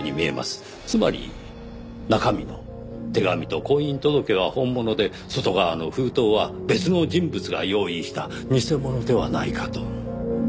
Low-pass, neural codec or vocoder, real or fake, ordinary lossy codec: none; none; real; none